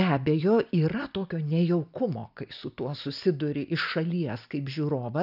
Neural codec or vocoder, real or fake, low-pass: none; real; 5.4 kHz